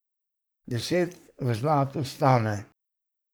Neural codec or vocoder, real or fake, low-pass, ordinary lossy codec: codec, 44.1 kHz, 3.4 kbps, Pupu-Codec; fake; none; none